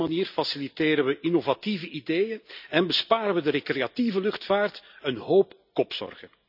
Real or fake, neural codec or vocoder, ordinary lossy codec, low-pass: real; none; none; 5.4 kHz